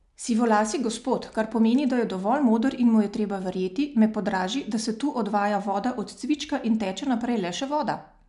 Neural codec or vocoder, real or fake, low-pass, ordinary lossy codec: none; real; 10.8 kHz; none